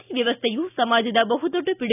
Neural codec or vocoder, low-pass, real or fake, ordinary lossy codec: none; 3.6 kHz; real; none